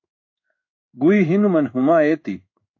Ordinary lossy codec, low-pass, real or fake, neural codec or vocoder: AAC, 48 kbps; 7.2 kHz; fake; codec, 16 kHz in and 24 kHz out, 1 kbps, XY-Tokenizer